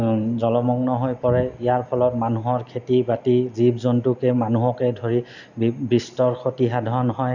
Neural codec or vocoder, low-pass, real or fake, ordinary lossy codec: none; 7.2 kHz; real; none